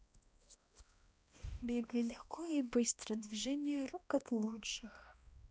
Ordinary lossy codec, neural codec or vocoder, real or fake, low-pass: none; codec, 16 kHz, 1 kbps, X-Codec, HuBERT features, trained on balanced general audio; fake; none